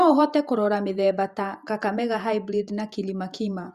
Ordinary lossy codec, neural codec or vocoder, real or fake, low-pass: Opus, 64 kbps; vocoder, 48 kHz, 128 mel bands, Vocos; fake; 14.4 kHz